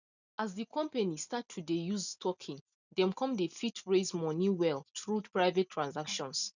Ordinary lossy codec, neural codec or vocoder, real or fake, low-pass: none; none; real; 7.2 kHz